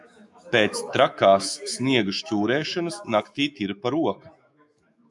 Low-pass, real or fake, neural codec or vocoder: 10.8 kHz; fake; autoencoder, 48 kHz, 128 numbers a frame, DAC-VAE, trained on Japanese speech